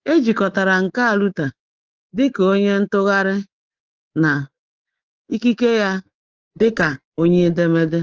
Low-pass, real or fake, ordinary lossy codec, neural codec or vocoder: 7.2 kHz; fake; Opus, 16 kbps; codec, 24 kHz, 3.1 kbps, DualCodec